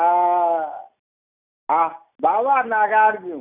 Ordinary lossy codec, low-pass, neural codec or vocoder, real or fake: none; 3.6 kHz; none; real